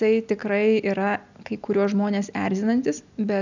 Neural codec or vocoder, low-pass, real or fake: none; 7.2 kHz; real